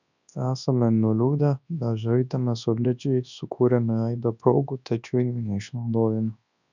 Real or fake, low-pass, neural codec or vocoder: fake; 7.2 kHz; codec, 24 kHz, 0.9 kbps, WavTokenizer, large speech release